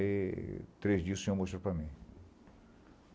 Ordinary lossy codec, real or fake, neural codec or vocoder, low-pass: none; real; none; none